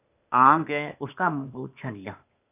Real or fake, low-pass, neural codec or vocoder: fake; 3.6 kHz; codec, 16 kHz, 0.8 kbps, ZipCodec